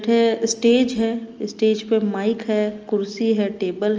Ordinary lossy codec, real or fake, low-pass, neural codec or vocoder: Opus, 24 kbps; real; 7.2 kHz; none